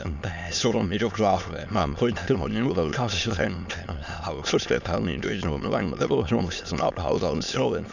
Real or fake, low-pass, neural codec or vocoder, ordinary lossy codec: fake; 7.2 kHz; autoencoder, 22.05 kHz, a latent of 192 numbers a frame, VITS, trained on many speakers; none